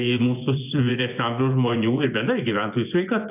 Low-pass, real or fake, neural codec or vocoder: 3.6 kHz; fake; vocoder, 24 kHz, 100 mel bands, Vocos